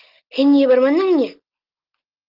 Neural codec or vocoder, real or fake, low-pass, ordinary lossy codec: none; real; 5.4 kHz; Opus, 32 kbps